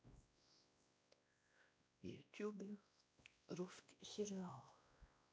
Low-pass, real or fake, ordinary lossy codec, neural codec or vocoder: none; fake; none; codec, 16 kHz, 1 kbps, X-Codec, WavLM features, trained on Multilingual LibriSpeech